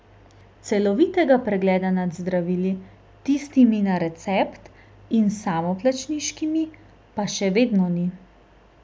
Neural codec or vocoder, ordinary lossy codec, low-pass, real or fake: none; none; none; real